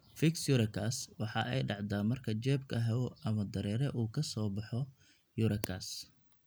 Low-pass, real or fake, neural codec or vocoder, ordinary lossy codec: none; real; none; none